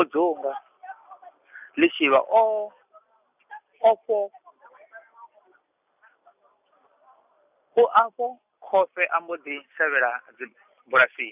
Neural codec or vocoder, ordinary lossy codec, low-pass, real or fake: none; none; 3.6 kHz; real